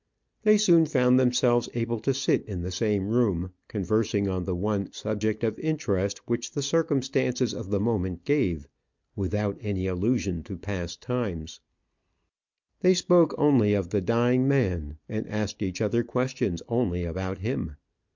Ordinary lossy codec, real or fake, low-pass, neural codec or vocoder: MP3, 64 kbps; real; 7.2 kHz; none